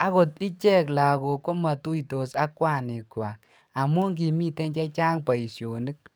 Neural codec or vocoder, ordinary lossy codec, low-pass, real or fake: codec, 44.1 kHz, 7.8 kbps, DAC; none; none; fake